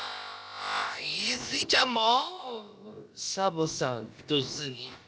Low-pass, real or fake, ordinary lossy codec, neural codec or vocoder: none; fake; none; codec, 16 kHz, about 1 kbps, DyCAST, with the encoder's durations